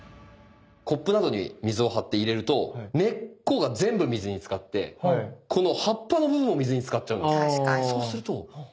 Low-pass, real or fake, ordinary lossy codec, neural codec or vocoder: none; real; none; none